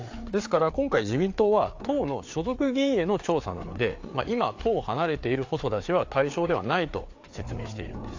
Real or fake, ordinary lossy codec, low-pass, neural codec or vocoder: fake; AAC, 48 kbps; 7.2 kHz; codec, 16 kHz, 4 kbps, FreqCodec, larger model